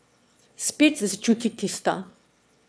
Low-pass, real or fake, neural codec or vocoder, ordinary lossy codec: none; fake; autoencoder, 22.05 kHz, a latent of 192 numbers a frame, VITS, trained on one speaker; none